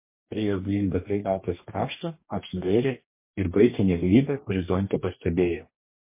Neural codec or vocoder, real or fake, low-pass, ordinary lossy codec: codec, 44.1 kHz, 2.6 kbps, DAC; fake; 3.6 kHz; MP3, 24 kbps